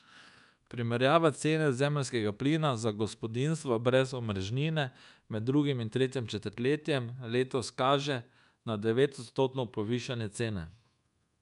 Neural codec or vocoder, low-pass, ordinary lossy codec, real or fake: codec, 24 kHz, 1.2 kbps, DualCodec; 10.8 kHz; none; fake